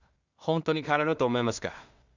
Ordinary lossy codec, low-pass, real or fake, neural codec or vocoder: Opus, 64 kbps; 7.2 kHz; fake; codec, 16 kHz in and 24 kHz out, 0.4 kbps, LongCat-Audio-Codec, two codebook decoder